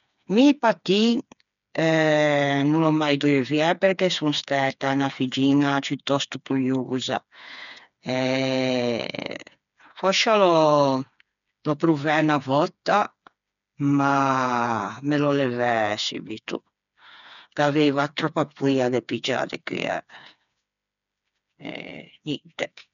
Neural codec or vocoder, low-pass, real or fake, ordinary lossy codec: codec, 16 kHz, 4 kbps, FreqCodec, smaller model; 7.2 kHz; fake; none